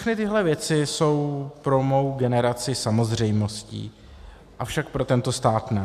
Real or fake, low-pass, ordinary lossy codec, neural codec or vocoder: real; 14.4 kHz; AAC, 96 kbps; none